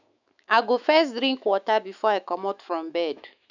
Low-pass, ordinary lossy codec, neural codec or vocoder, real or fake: 7.2 kHz; none; autoencoder, 48 kHz, 128 numbers a frame, DAC-VAE, trained on Japanese speech; fake